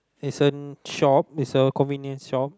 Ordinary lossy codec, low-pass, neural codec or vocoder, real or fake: none; none; none; real